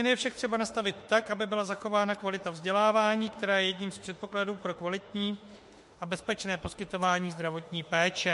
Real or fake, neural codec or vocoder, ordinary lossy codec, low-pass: fake; autoencoder, 48 kHz, 32 numbers a frame, DAC-VAE, trained on Japanese speech; MP3, 48 kbps; 14.4 kHz